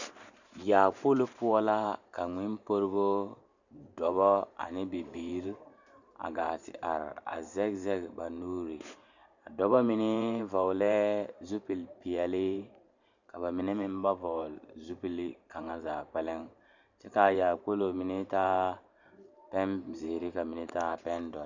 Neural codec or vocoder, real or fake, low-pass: vocoder, 44.1 kHz, 128 mel bands every 512 samples, BigVGAN v2; fake; 7.2 kHz